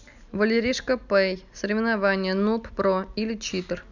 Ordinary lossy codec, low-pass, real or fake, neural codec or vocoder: none; 7.2 kHz; real; none